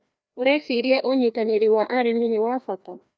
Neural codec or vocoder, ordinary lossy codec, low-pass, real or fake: codec, 16 kHz, 1 kbps, FreqCodec, larger model; none; none; fake